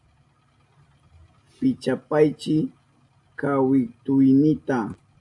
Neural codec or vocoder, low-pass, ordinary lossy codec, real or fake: none; 10.8 kHz; AAC, 64 kbps; real